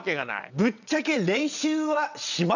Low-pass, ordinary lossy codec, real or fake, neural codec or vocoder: 7.2 kHz; none; fake; vocoder, 22.05 kHz, 80 mel bands, WaveNeXt